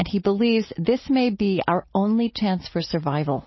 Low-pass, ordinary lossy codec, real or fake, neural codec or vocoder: 7.2 kHz; MP3, 24 kbps; real; none